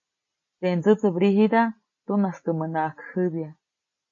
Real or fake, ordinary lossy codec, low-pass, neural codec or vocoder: real; MP3, 32 kbps; 7.2 kHz; none